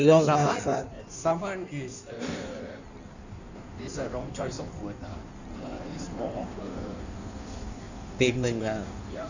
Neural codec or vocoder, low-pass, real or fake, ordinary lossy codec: codec, 16 kHz in and 24 kHz out, 1.1 kbps, FireRedTTS-2 codec; 7.2 kHz; fake; none